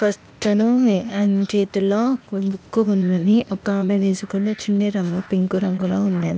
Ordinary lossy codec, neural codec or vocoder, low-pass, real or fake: none; codec, 16 kHz, 0.8 kbps, ZipCodec; none; fake